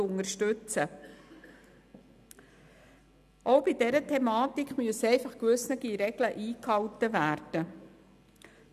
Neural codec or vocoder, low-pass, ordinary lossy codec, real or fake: none; 14.4 kHz; none; real